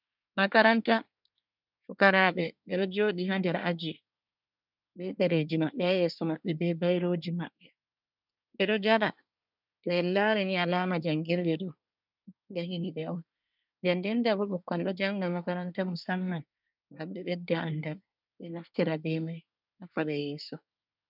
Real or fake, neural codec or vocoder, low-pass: fake; codec, 24 kHz, 1 kbps, SNAC; 5.4 kHz